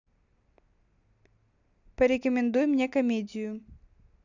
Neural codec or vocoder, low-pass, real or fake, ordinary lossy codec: none; 7.2 kHz; real; none